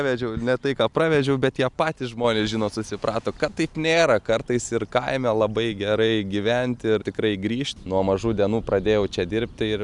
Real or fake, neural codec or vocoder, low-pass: real; none; 10.8 kHz